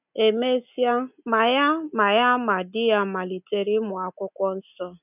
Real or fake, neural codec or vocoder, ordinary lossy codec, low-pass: real; none; none; 3.6 kHz